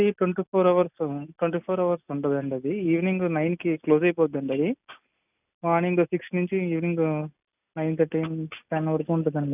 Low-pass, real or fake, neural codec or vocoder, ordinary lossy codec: 3.6 kHz; real; none; none